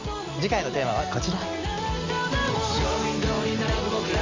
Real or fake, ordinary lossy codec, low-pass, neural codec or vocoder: real; MP3, 64 kbps; 7.2 kHz; none